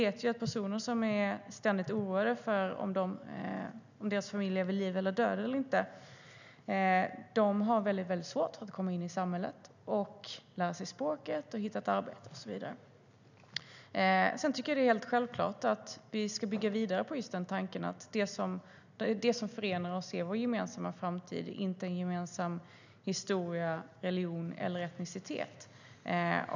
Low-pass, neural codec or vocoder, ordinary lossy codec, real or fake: 7.2 kHz; none; none; real